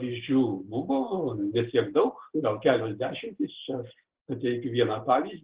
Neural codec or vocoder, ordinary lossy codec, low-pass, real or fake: none; Opus, 16 kbps; 3.6 kHz; real